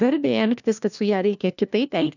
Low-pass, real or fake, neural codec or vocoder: 7.2 kHz; fake; codec, 16 kHz, 1 kbps, FunCodec, trained on LibriTTS, 50 frames a second